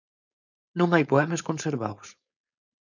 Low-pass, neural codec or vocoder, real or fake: 7.2 kHz; codec, 16 kHz, 4.8 kbps, FACodec; fake